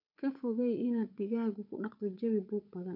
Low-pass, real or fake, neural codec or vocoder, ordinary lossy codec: 5.4 kHz; fake; codec, 16 kHz, 8 kbps, FunCodec, trained on Chinese and English, 25 frames a second; none